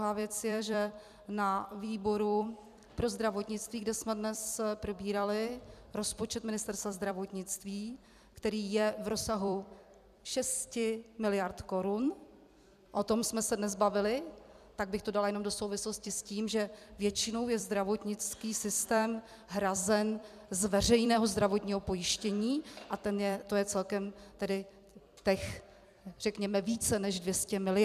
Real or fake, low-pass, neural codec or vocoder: fake; 14.4 kHz; vocoder, 44.1 kHz, 128 mel bands every 256 samples, BigVGAN v2